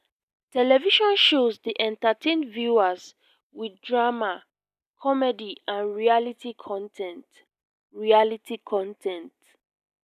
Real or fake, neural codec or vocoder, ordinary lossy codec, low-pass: real; none; none; 14.4 kHz